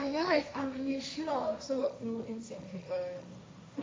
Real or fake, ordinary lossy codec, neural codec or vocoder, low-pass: fake; none; codec, 16 kHz, 1.1 kbps, Voila-Tokenizer; none